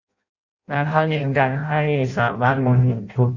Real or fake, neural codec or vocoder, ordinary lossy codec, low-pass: fake; codec, 16 kHz in and 24 kHz out, 0.6 kbps, FireRedTTS-2 codec; Opus, 64 kbps; 7.2 kHz